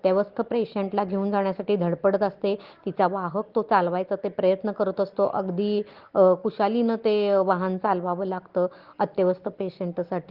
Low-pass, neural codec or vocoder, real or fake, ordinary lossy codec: 5.4 kHz; none; real; Opus, 16 kbps